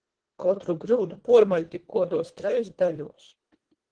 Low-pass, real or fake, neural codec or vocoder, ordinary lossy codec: 9.9 kHz; fake; codec, 24 kHz, 1.5 kbps, HILCodec; Opus, 16 kbps